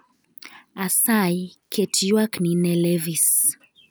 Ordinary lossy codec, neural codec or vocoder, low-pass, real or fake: none; none; none; real